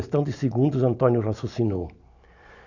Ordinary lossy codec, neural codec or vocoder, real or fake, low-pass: none; none; real; 7.2 kHz